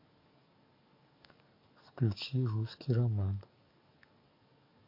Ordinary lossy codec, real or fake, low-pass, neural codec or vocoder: MP3, 32 kbps; fake; 5.4 kHz; codec, 44.1 kHz, 7.8 kbps, DAC